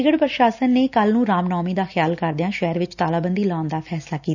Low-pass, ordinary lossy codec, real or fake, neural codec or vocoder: 7.2 kHz; none; real; none